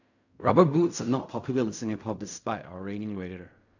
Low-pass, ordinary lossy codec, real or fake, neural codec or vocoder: 7.2 kHz; none; fake; codec, 16 kHz in and 24 kHz out, 0.4 kbps, LongCat-Audio-Codec, fine tuned four codebook decoder